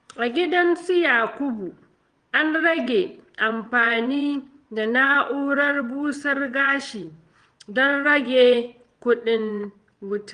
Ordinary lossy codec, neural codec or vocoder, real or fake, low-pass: Opus, 24 kbps; vocoder, 22.05 kHz, 80 mel bands, WaveNeXt; fake; 9.9 kHz